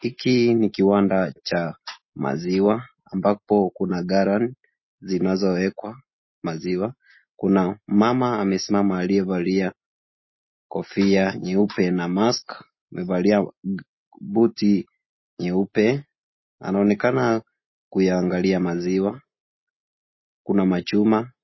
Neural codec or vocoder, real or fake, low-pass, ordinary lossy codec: none; real; 7.2 kHz; MP3, 24 kbps